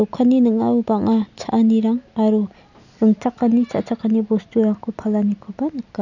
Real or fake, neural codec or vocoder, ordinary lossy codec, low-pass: real; none; none; 7.2 kHz